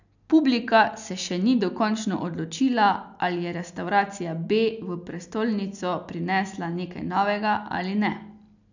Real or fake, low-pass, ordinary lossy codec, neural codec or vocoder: real; 7.2 kHz; none; none